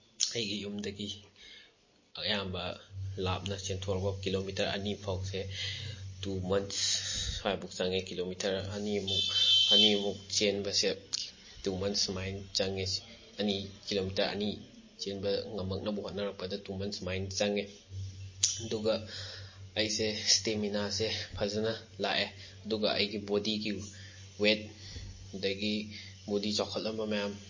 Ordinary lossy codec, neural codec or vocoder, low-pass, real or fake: MP3, 32 kbps; none; 7.2 kHz; real